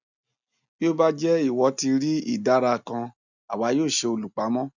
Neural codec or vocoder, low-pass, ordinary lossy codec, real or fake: none; 7.2 kHz; none; real